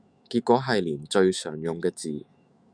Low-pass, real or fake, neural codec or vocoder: 9.9 kHz; fake; autoencoder, 48 kHz, 128 numbers a frame, DAC-VAE, trained on Japanese speech